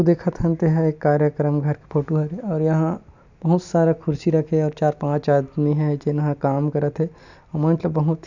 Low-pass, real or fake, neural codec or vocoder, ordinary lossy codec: 7.2 kHz; real; none; none